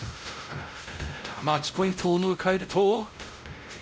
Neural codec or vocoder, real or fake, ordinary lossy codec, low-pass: codec, 16 kHz, 0.5 kbps, X-Codec, WavLM features, trained on Multilingual LibriSpeech; fake; none; none